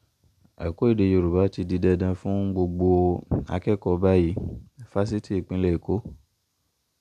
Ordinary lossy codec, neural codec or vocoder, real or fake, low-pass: none; none; real; 14.4 kHz